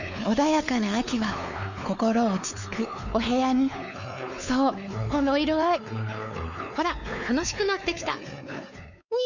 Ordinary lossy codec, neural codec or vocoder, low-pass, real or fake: none; codec, 16 kHz, 4 kbps, X-Codec, WavLM features, trained on Multilingual LibriSpeech; 7.2 kHz; fake